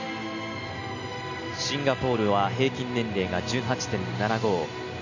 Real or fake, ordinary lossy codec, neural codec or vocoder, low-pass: real; none; none; 7.2 kHz